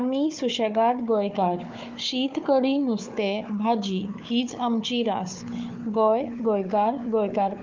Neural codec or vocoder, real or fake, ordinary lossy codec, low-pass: codec, 16 kHz, 4 kbps, FunCodec, trained on Chinese and English, 50 frames a second; fake; Opus, 32 kbps; 7.2 kHz